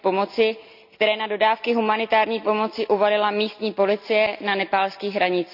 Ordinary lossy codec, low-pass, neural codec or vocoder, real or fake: none; 5.4 kHz; none; real